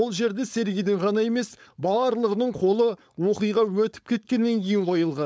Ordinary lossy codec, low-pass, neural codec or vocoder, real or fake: none; none; codec, 16 kHz, 4.8 kbps, FACodec; fake